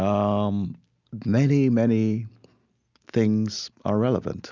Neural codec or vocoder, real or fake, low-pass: none; real; 7.2 kHz